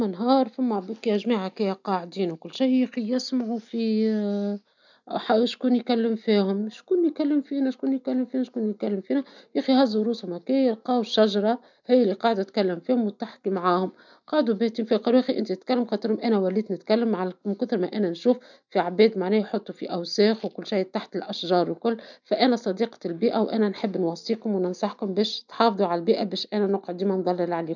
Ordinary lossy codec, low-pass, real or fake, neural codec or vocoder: none; 7.2 kHz; real; none